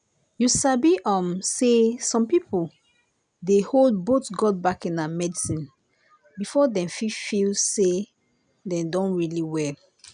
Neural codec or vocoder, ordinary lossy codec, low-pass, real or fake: none; none; 9.9 kHz; real